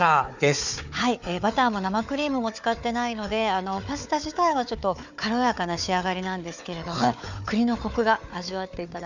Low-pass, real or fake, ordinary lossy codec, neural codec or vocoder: 7.2 kHz; fake; none; codec, 16 kHz, 4 kbps, FunCodec, trained on Chinese and English, 50 frames a second